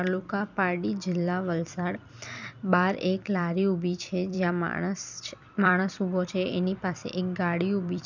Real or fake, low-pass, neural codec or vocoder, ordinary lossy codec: fake; 7.2 kHz; vocoder, 44.1 kHz, 128 mel bands every 256 samples, BigVGAN v2; none